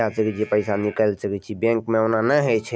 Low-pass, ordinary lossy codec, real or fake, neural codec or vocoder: none; none; real; none